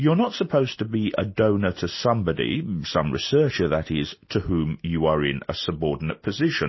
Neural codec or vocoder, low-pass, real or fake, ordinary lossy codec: none; 7.2 kHz; real; MP3, 24 kbps